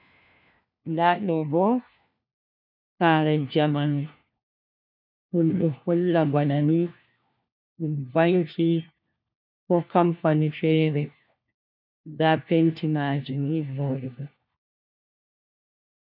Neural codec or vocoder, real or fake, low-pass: codec, 16 kHz, 1 kbps, FunCodec, trained on LibriTTS, 50 frames a second; fake; 5.4 kHz